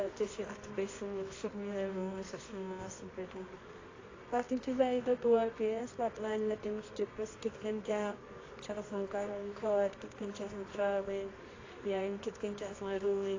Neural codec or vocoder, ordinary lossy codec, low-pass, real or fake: codec, 24 kHz, 0.9 kbps, WavTokenizer, medium music audio release; AAC, 32 kbps; 7.2 kHz; fake